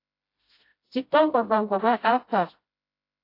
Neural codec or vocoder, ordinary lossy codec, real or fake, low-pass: codec, 16 kHz, 0.5 kbps, FreqCodec, smaller model; AAC, 32 kbps; fake; 5.4 kHz